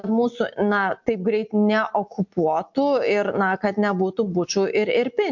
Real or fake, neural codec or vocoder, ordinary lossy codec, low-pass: real; none; MP3, 48 kbps; 7.2 kHz